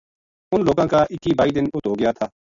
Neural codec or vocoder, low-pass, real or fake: none; 7.2 kHz; real